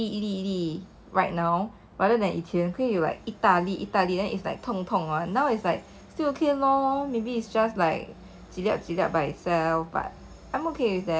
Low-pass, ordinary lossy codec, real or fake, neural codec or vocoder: none; none; real; none